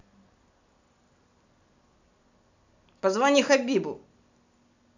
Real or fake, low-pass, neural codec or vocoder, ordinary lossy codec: real; 7.2 kHz; none; none